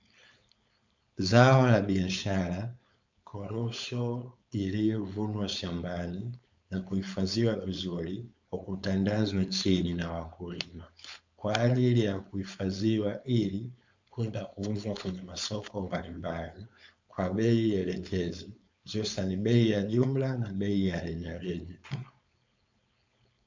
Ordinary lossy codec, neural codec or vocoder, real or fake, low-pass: MP3, 64 kbps; codec, 16 kHz, 4.8 kbps, FACodec; fake; 7.2 kHz